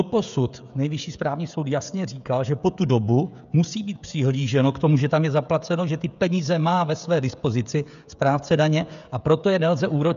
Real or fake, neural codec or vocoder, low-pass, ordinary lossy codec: fake; codec, 16 kHz, 16 kbps, FreqCodec, smaller model; 7.2 kHz; MP3, 96 kbps